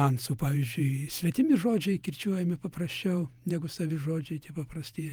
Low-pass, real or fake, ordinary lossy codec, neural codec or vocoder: 19.8 kHz; fake; Opus, 32 kbps; vocoder, 48 kHz, 128 mel bands, Vocos